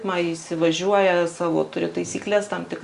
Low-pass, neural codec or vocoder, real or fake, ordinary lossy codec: 10.8 kHz; none; real; Opus, 64 kbps